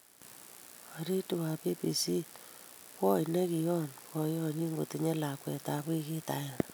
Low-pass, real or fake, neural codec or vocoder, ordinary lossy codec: none; real; none; none